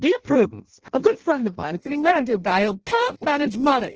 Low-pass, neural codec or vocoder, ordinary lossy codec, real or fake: 7.2 kHz; codec, 16 kHz in and 24 kHz out, 0.6 kbps, FireRedTTS-2 codec; Opus, 16 kbps; fake